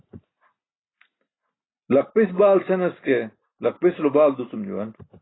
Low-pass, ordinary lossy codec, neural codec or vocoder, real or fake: 7.2 kHz; AAC, 16 kbps; none; real